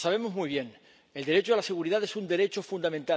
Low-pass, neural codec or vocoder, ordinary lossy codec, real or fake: none; none; none; real